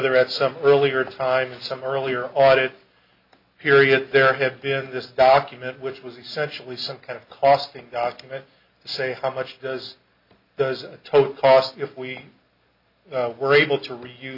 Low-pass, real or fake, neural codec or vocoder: 5.4 kHz; real; none